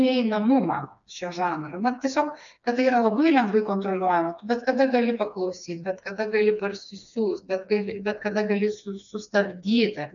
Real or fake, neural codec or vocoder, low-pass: fake; codec, 16 kHz, 2 kbps, FreqCodec, smaller model; 7.2 kHz